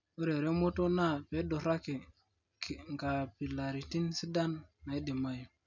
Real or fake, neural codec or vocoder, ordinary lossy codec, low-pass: real; none; none; 7.2 kHz